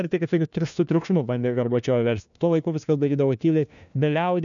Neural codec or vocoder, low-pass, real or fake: codec, 16 kHz, 1 kbps, FunCodec, trained on LibriTTS, 50 frames a second; 7.2 kHz; fake